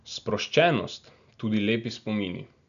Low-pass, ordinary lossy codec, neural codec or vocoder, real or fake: 7.2 kHz; none; none; real